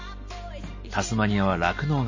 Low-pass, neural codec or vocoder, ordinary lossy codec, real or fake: 7.2 kHz; none; MP3, 32 kbps; real